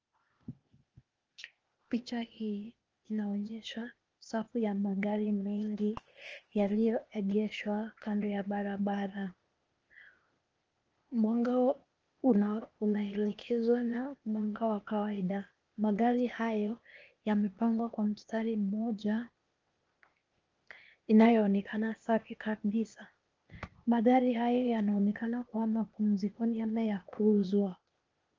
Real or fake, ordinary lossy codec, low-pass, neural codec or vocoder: fake; Opus, 24 kbps; 7.2 kHz; codec, 16 kHz, 0.8 kbps, ZipCodec